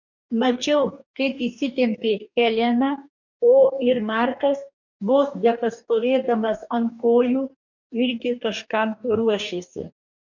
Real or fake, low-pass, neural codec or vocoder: fake; 7.2 kHz; codec, 44.1 kHz, 2.6 kbps, DAC